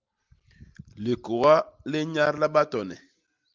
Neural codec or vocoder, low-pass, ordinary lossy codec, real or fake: none; 7.2 kHz; Opus, 32 kbps; real